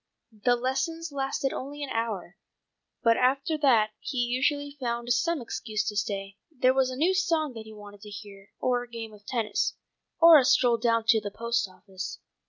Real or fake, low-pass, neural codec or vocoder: real; 7.2 kHz; none